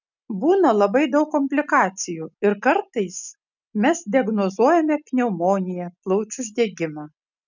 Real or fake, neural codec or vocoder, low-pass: real; none; 7.2 kHz